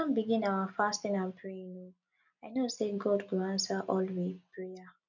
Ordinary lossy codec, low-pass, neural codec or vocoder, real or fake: none; 7.2 kHz; none; real